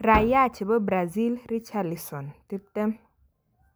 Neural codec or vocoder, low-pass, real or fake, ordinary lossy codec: none; none; real; none